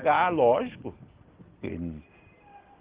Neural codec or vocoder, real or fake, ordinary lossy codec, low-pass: none; real; Opus, 16 kbps; 3.6 kHz